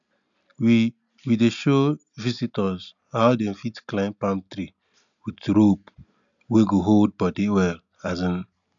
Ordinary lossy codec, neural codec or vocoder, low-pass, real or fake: none; none; 7.2 kHz; real